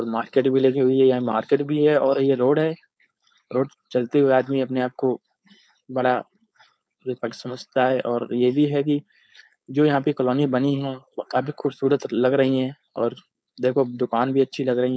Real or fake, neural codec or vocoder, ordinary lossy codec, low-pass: fake; codec, 16 kHz, 4.8 kbps, FACodec; none; none